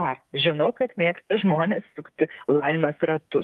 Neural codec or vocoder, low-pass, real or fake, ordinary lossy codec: codec, 44.1 kHz, 2.6 kbps, SNAC; 14.4 kHz; fake; Opus, 32 kbps